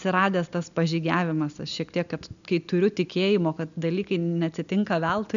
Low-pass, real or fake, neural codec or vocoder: 7.2 kHz; real; none